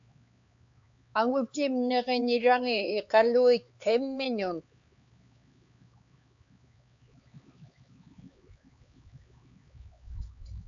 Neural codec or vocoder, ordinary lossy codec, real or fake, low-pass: codec, 16 kHz, 4 kbps, X-Codec, HuBERT features, trained on LibriSpeech; AAC, 64 kbps; fake; 7.2 kHz